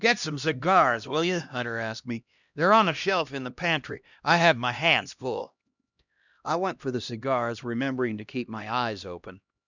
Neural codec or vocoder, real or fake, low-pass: codec, 16 kHz, 1 kbps, X-Codec, HuBERT features, trained on LibriSpeech; fake; 7.2 kHz